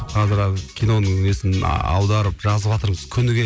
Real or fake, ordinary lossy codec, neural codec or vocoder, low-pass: real; none; none; none